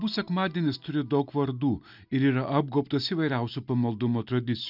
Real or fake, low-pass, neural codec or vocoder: real; 5.4 kHz; none